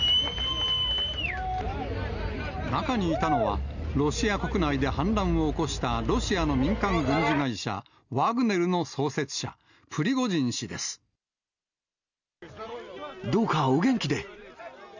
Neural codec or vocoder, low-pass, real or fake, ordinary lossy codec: none; 7.2 kHz; real; none